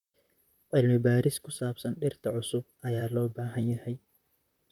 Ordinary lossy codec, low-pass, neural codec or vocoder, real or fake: none; 19.8 kHz; vocoder, 44.1 kHz, 128 mel bands, Pupu-Vocoder; fake